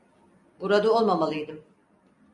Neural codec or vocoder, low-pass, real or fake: none; 10.8 kHz; real